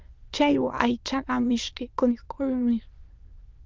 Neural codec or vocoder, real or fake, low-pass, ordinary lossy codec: autoencoder, 22.05 kHz, a latent of 192 numbers a frame, VITS, trained on many speakers; fake; 7.2 kHz; Opus, 32 kbps